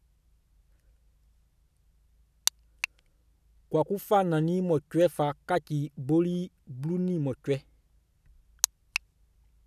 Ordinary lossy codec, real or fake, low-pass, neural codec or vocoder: none; real; 14.4 kHz; none